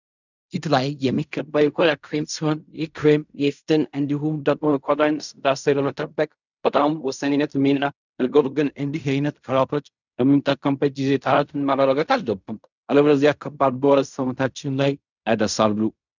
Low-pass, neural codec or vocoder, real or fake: 7.2 kHz; codec, 16 kHz in and 24 kHz out, 0.4 kbps, LongCat-Audio-Codec, fine tuned four codebook decoder; fake